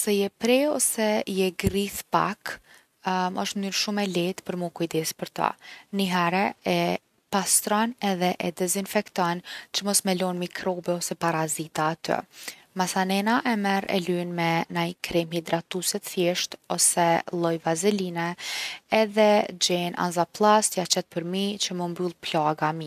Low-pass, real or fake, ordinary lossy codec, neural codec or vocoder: 14.4 kHz; real; none; none